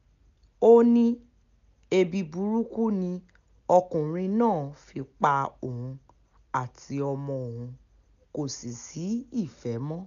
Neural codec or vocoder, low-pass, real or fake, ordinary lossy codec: none; 7.2 kHz; real; MP3, 96 kbps